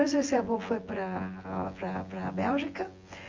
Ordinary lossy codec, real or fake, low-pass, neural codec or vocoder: Opus, 32 kbps; fake; 7.2 kHz; vocoder, 24 kHz, 100 mel bands, Vocos